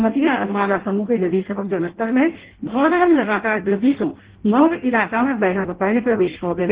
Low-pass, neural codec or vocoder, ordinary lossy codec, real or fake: 3.6 kHz; codec, 16 kHz in and 24 kHz out, 0.6 kbps, FireRedTTS-2 codec; Opus, 16 kbps; fake